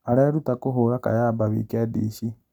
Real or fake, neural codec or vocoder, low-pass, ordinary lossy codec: real; none; 19.8 kHz; none